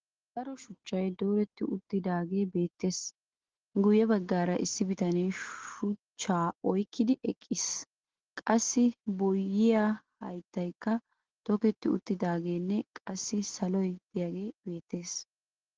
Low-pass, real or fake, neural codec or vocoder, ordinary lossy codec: 7.2 kHz; real; none; Opus, 16 kbps